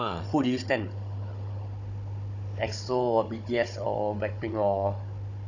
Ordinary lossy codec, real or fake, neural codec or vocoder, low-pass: none; fake; codec, 16 kHz, 16 kbps, FunCodec, trained on Chinese and English, 50 frames a second; 7.2 kHz